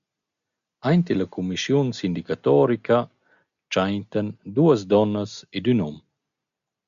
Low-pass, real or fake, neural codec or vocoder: 7.2 kHz; real; none